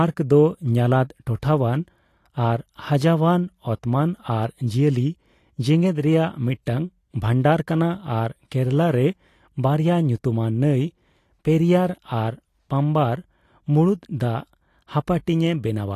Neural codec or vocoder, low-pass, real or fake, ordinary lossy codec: none; 14.4 kHz; real; AAC, 48 kbps